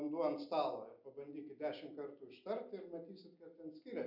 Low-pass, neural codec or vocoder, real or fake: 5.4 kHz; none; real